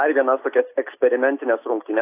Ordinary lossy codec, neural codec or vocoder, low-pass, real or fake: AAC, 24 kbps; none; 3.6 kHz; real